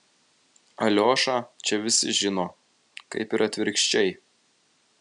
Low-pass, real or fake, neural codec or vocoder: 9.9 kHz; real; none